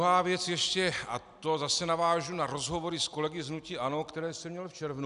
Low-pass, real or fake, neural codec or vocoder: 10.8 kHz; real; none